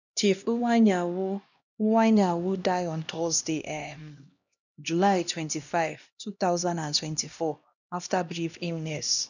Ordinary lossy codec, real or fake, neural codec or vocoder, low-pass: none; fake; codec, 16 kHz, 1 kbps, X-Codec, HuBERT features, trained on LibriSpeech; 7.2 kHz